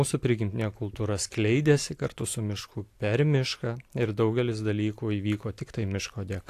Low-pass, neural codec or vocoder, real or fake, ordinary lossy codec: 14.4 kHz; none; real; AAC, 64 kbps